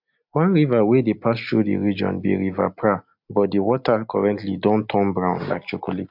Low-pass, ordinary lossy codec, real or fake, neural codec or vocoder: 5.4 kHz; MP3, 48 kbps; real; none